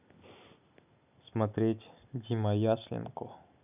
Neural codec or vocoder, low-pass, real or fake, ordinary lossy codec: none; 3.6 kHz; real; none